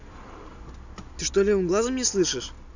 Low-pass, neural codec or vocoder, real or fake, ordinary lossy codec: 7.2 kHz; none; real; none